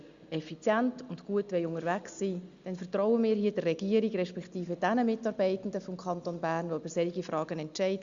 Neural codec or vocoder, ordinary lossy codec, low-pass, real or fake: none; Opus, 64 kbps; 7.2 kHz; real